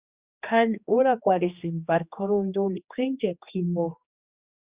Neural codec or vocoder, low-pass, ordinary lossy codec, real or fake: codec, 16 kHz, 2 kbps, X-Codec, HuBERT features, trained on general audio; 3.6 kHz; Opus, 64 kbps; fake